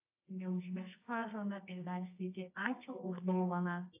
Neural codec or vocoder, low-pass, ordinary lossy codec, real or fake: codec, 24 kHz, 0.9 kbps, WavTokenizer, medium music audio release; 3.6 kHz; AAC, 24 kbps; fake